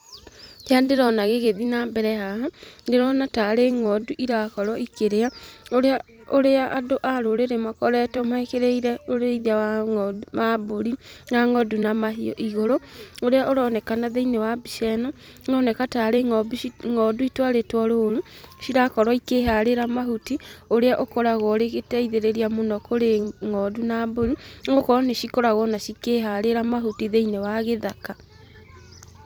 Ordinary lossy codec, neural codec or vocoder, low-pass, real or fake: none; vocoder, 44.1 kHz, 128 mel bands every 256 samples, BigVGAN v2; none; fake